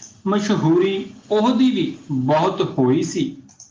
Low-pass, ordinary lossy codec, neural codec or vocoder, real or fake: 7.2 kHz; Opus, 24 kbps; none; real